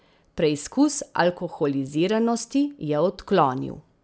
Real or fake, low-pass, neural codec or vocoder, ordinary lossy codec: real; none; none; none